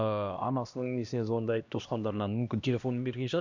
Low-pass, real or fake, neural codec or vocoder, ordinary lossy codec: 7.2 kHz; fake; codec, 16 kHz, 1 kbps, X-Codec, HuBERT features, trained on LibriSpeech; none